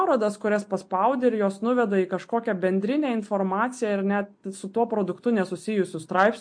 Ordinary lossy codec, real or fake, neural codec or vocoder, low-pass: MP3, 48 kbps; real; none; 9.9 kHz